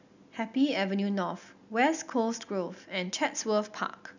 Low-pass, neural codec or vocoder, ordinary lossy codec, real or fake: 7.2 kHz; none; none; real